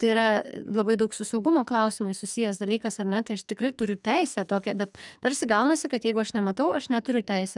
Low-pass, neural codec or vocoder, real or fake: 10.8 kHz; codec, 44.1 kHz, 2.6 kbps, SNAC; fake